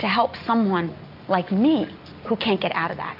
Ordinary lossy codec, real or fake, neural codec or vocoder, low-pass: AAC, 48 kbps; real; none; 5.4 kHz